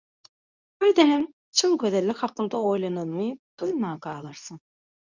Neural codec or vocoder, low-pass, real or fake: codec, 24 kHz, 0.9 kbps, WavTokenizer, medium speech release version 2; 7.2 kHz; fake